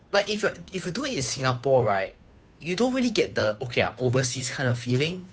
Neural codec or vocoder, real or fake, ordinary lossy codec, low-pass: codec, 16 kHz, 2 kbps, FunCodec, trained on Chinese and English, 25 frames a second; fake; none; none